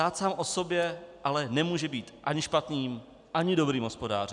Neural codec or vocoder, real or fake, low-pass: none; real; 10.8 kHz